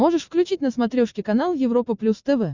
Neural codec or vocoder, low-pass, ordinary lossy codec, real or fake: none; 7.2 kHz; Opus, 64 kbps; real